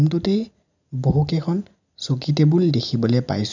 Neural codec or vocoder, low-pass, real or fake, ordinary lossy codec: none; 7.2 kHz; real; none